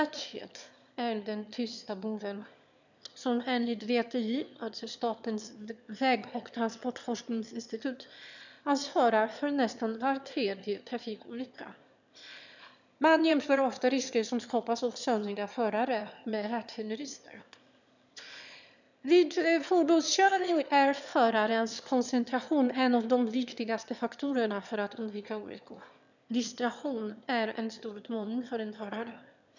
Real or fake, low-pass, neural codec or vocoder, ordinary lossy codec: fake; 7.2 kHz; autoencoder, 22.05 kHz, a latent of 192 numbers a frame, VITS, trained on one speaker; none